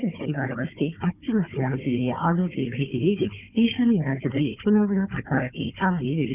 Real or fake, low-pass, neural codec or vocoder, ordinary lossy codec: fake; 3.6 kHz; codec, 16 kHz, 4 kbps, FunCodec, trained on Chinese and English, 50 frames a second; none